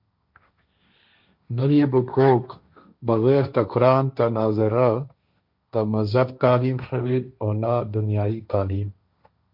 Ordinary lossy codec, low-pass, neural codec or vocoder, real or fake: MP3, 48 kbps; 5.4 kHz; codec, 16 kHz, 1.1 kbps, Voila-Tokenizer; fake